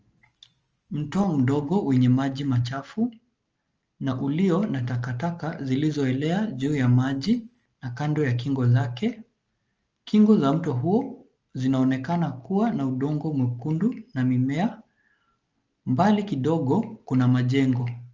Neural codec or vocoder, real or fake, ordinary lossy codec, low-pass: none; real; Opus, 24 kbps; 7.2 kHz